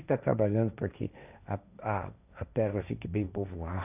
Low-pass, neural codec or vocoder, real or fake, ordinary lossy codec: 3.6 kHz; codec, 16 kHz, 1.1 kbps, Voila-Tokenizer; fake; none